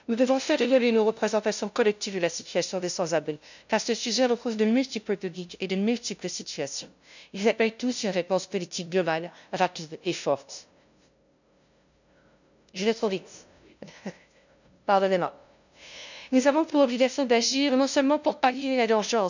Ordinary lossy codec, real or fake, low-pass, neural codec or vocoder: none; fake; 7.2 kHz; codec, 16 kHz, 0.5 kbps, FunCodec, trained on LibriTTS, 25 frames a second